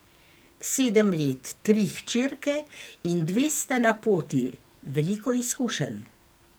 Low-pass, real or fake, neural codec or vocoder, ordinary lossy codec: none; fake; codec, 44.1 kHz, 2.6 kbps, SNAC; none